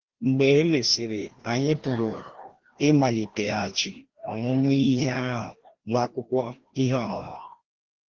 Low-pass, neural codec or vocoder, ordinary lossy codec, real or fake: 7.2 kHz; codec, 16 kHz, 1 kbps, FreqCodec, larger model; Opus, 16 kbps; fake